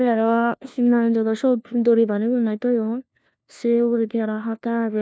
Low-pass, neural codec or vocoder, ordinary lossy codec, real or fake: none; codec, 16 kHz, 1 kbps, FunCodec, trained on LibriTTS, 50 frames a second; none; fake